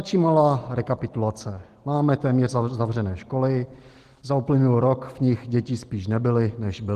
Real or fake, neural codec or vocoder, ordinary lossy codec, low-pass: real; none; Opus, 16 kbps; 14.4 kHz